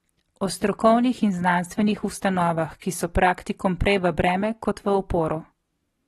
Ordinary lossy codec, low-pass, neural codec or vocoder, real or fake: AAC, 32 kbps; 19.8 kHz; none; real